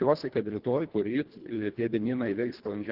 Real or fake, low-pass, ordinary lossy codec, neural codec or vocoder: fake; 5.4 kHz; Opus, 16 kbps; codec, 24 kHz, 1.5 kbps, HILCodec